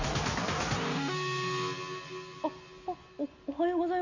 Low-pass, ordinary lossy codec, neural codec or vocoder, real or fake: 7.2 kHz; none; none; real